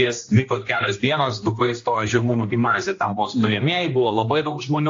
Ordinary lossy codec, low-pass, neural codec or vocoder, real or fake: AAC, 48 kbps; 7.2 kHz; codec, 16 kHz, 1 kbps, X-Codec, HuBERT features, trained on general audio; fake